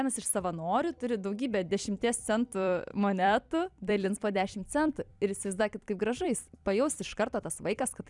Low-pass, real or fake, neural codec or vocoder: 10.8 kHz; fake; vocoder, 44.1 kHz, 128 mel bands every 256 samples, BigVGAN v2